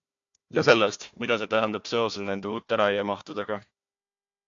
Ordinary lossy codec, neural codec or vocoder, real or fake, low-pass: AAC, 64 kbps; codec, 16 kHz, 1 kbps, FunCodec, trained on Chinese and English, 50 frames a second; fake; 7.2 kHz